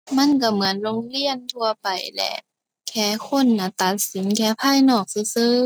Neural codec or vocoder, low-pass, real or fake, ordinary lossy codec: none; none; real; none